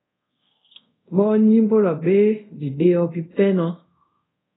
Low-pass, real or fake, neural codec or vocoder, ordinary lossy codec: 7.2 kHz; fake; codec, 24 kHz, 0.5 kbps, DualCodec; AAC, 16 kbps